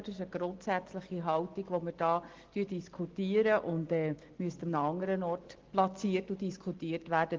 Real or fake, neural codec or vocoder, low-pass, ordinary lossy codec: real; none; 7.2 kHz; Opus, 16 kbps